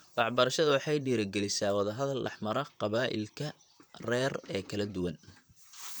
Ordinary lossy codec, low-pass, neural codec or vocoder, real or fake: none; none; vocoder, 44.1 kHz, 128 mel bands, Pupu-Vocoder; fake